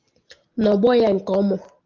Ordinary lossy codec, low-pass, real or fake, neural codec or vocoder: Opus, 24 kbps; 7.2 kHz; real; none